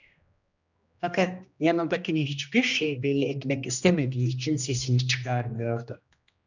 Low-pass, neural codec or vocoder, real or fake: 7.2 kHz; codec, 16 kHz, 1 kbps, X-Codec, HuBERT features, trained on general audio; fake